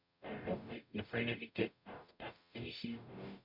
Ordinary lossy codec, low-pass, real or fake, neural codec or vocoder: none; 5.4 kHz; fake; codec, 44.1 kHz, 0.9 kbps, DAC